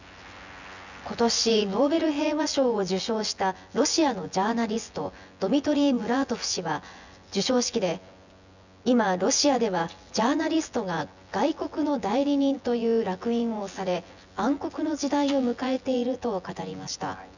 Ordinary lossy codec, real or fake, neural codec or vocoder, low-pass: none; fake; vocoder, 24 kHz, 100 mel bands, Vocos; 7.2 kHz